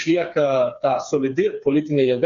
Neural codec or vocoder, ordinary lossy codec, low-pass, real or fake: codec, 16 kHz, 4 kbps, FreqCodec, smaller model; Opus, 64 kbps; 7.2 kHz; fake